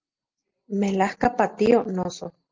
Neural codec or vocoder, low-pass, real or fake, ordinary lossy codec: none; 7.2 kHz; real; Opus, 24 kbps